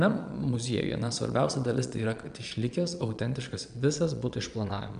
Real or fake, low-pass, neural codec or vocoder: fake; 9.9 kHz; vocoder, 22.05 kHz, 80 mel bands, Vocos